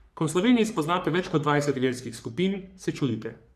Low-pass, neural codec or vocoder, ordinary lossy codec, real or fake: 14.4 kHz; codec, 44.1 kHz, 3.4 kbps, Pupu-Codec; none; fake